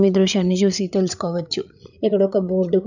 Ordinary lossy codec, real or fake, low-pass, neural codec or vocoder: none; fake; 7.2 kHz; codec, 16 kHz, 8 kbps, FreqCodec, larger model